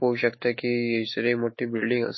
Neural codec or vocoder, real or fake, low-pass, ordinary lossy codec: autoencoder, 48 kHz, 128 numbers a frame, DAC-VAE, trained on Japanese speech; fake; 7.2 kHz; MP3, 24 kbps